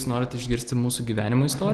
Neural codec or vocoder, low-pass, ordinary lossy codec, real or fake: none; 10.8 kHz; Opus, 16 kbps; real